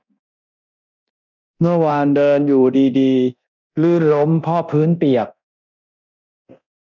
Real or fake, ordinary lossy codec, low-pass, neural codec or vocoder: fake; none; 7.2 kHz; codec, 24 kHz, 0.9 kbps, DualCodec